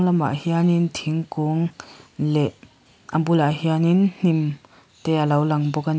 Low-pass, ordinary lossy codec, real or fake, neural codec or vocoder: none; none; real; none